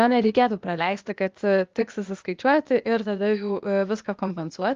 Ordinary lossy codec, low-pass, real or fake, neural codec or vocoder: Opus, 32 kbps; 7.2 kHz; fake; codec, 16 kHz, 0.8 kbps, ZipCodec